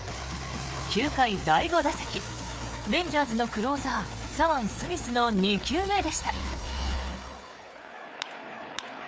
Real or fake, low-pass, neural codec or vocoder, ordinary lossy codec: fake; none; codec, 16 kHz, 4 kbps, FreqCodec, larger model; none